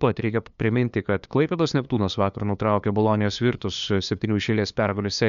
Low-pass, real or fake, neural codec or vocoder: 7.2 kHz; fake; codec, 16 kHz, 2 kbps, FunCodec, trained on LibriTTS, 25 frames a second